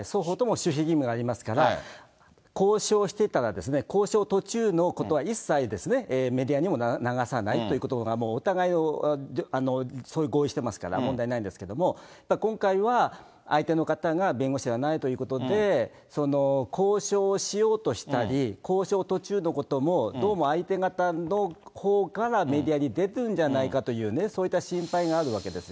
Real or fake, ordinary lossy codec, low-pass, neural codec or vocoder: real; none; none; none